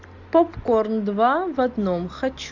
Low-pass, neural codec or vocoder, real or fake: 7.2 kHz; none; real